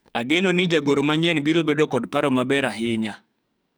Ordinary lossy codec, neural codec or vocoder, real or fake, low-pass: none; codec, 44.1 kHz, 2.6 kbps, SNAC; fake; none